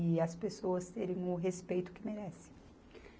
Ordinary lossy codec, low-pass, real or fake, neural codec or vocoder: none; none; real; none